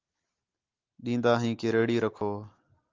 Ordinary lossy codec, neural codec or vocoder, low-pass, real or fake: Opus, 32 kbps; none; 7.2 kHz; real